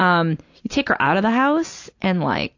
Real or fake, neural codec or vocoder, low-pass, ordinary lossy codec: real; none; 7.2 kHz; AAC, 48 kbps